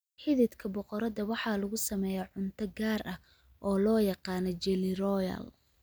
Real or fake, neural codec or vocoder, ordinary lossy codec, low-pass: real; none; none; none